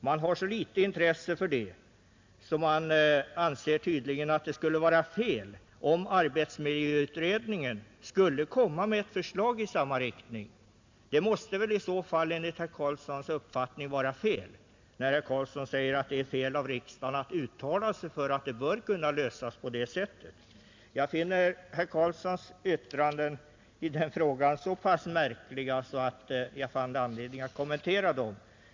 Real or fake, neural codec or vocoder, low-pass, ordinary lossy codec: real; none; 7.2 kHz; MP3, 64 kbps